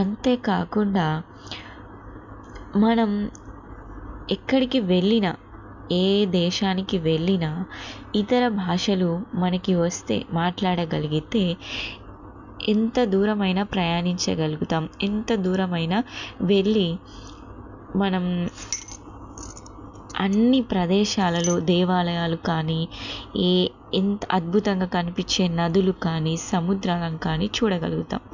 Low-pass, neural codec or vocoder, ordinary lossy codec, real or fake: 7.2 kHz; none; none; real